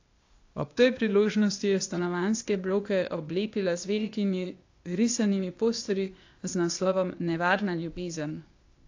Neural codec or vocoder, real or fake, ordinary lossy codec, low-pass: codec, 16 kHz, 0.8 kbps, ZipCodec; fake; AAC, 48 kbps; 7.2 kHz